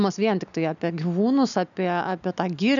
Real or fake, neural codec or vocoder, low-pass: real; none; 7.2 kHz